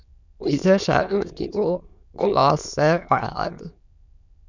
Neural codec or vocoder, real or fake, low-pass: autoencoder, 22.05 kHz, a latent of 192 numbers a frame, VITS, trained on many speakers; fake; 7.2 kHz